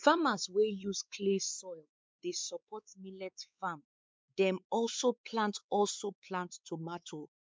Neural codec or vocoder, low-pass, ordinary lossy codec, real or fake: codec, 16 kHz, 4 kbps, X-Codec, WavLM features, trained on Multilingual LibriSpeech; none; none; fake